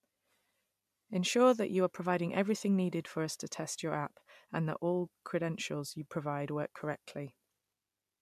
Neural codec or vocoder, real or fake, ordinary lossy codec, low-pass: none; real; MP3, 96 kbps; 14.4 kHz